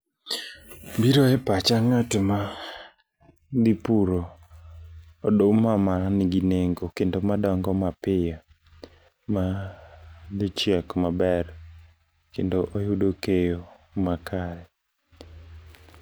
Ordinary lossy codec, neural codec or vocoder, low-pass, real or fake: none; none; none; real